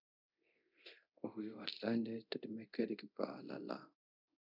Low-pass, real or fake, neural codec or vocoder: 5.4 kHz; fake; codec, 24 kHz, 0.9 kbps, DualCodec